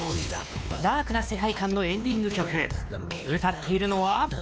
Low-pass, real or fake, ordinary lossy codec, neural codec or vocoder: none; fake; none; codec, 16 kHz, 2 kbps, X-Codec, WavLM features, trained on Multilingual LibriSpeech